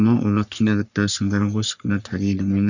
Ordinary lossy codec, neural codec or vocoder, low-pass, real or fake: none; codec, 44.1 kHz, 3.4 kbps, Pupu-Codec; 7.2 kHz; fake